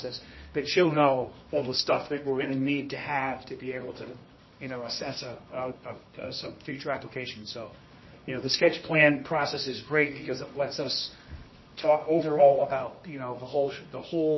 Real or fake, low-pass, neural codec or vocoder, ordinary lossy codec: fake; 7.2 kHz; codec, 24 kHz, 0.9 kbps, WavTokenizer, medium music audio release; MP3, 24 kbps